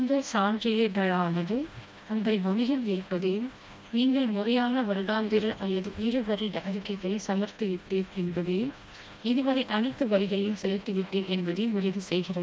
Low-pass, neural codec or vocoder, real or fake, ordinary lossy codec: none; codec, 16 kHz, 1 kbps, FreqCodec, smaller model; fake; none